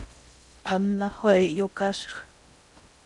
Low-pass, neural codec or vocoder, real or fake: 10.8 kHz; codec, 16 kHz in and 24 kHz out, 0.6 kbps, FocalCodec, streaming, 4096 codes; fake